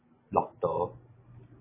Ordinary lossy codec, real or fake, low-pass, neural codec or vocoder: MP3, 16 kbps; real; 3.6 kHz; none